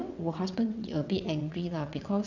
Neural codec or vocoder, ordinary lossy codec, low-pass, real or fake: vocoder, 22.05 kHz, 80 mel bands, WaveNeXt; none; 7.2 kHz; fake